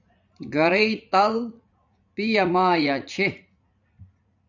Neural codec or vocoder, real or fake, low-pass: vocoder, 44.1 kHz, 80 mel bands, Vocos; fake; 7.2 kHz